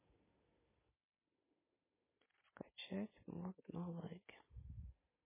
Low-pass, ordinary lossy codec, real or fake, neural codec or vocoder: 3.6 kHz; MP3, 16 kbps; real; none